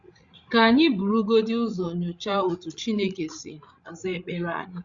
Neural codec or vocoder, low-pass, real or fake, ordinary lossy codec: codec, 16 kHz, 16 kbps, FreqCodec, larger model; 7.2 kHz; fake; Opus, 64 kbps